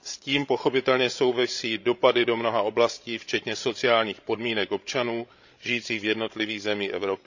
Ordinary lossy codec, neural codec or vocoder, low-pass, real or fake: none; codec, 16 kHz, 16 kbps, FreqCodec, larger model; 7.2 kHz; fake